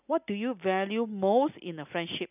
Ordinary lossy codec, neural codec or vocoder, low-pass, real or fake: none; none; 3.6 kHz; real